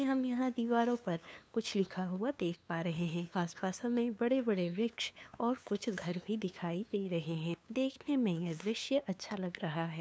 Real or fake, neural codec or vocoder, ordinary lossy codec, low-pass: fake; codec, 16 kHz, 2 kbps, FunCodec, trained on LibriTTS, 25 frames a second; none; none